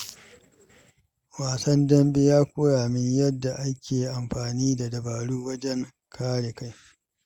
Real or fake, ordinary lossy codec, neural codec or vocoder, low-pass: real; Opus, 64 kbps; none; 19.8 kHz